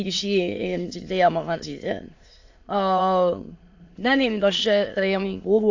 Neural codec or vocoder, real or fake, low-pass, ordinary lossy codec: autoencoder, 22.05 kHz, a latent of 192 numbers a frame, VITS, trained on many speakers; fake; 7.2 kHz; AAC, 48 kbps